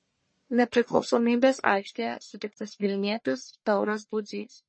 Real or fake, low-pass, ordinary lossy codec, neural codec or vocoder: fake; 9.9 kHz; MP3, 32 kbps; codec, 44.1 kHz, 1.7 kbps, Pupu-Codec